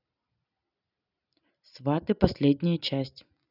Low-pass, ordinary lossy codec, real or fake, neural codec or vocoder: 5.4 kHz; none; real; none